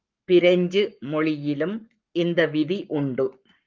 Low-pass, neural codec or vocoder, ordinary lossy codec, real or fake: 7.2 kHz; codec, 44.1 kHz, 7.8 kbps, Pupu-Codec; Opus, 24 kbps; fake